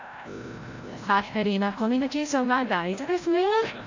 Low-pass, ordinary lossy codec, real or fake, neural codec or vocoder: 7.2 kHz; AAC, 48 kbps; fake; codec, 16 kHz, 0.5 kbps, FreqCodec, larger model